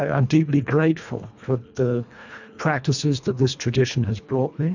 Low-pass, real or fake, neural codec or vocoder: 7.2 kHz; fake; codec, 24 kHz, 1.5 kbps, HILCodec